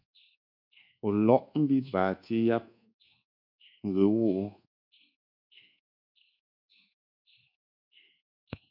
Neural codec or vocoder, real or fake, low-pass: codec, 24 kHz, 1.2 kbps, DualCodec; fake; 5.4 kHz